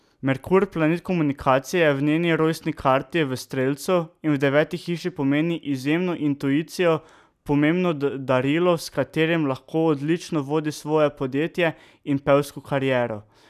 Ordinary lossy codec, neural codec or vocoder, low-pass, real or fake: none; none; 14.4 kHz; real